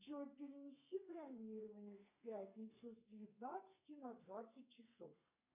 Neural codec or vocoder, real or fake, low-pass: codec, 32 kHz, 1.9 kbps, SNAC; fake; 3.6 kHz